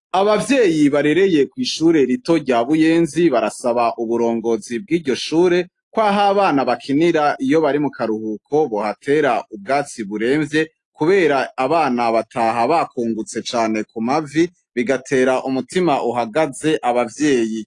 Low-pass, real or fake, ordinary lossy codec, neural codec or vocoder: 10.8 kHz; real; AAC, 48 kbps; none